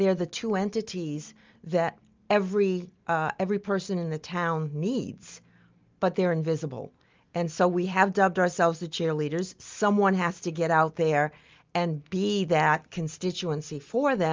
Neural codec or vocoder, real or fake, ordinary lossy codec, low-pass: none; real; Opus, 32 kbps; 7.2 kHz